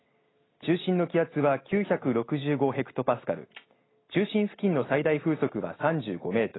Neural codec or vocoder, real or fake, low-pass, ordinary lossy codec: none; real; 7.2 kHz; AAC, 16 kbps